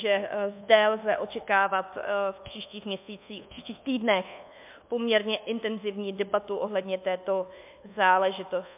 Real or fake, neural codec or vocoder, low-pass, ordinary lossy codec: fake; codec, 24 kHz, 1.2 kbps, DualCodec; 3.6 kHz; MP3, 32 kbps